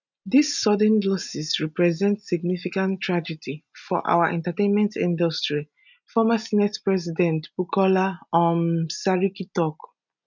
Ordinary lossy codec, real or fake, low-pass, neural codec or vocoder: none; real; 7.2 kHz; none